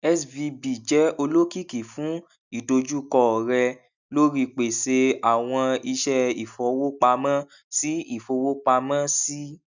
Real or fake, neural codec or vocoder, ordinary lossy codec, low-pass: real; none; none; 7.2 kHz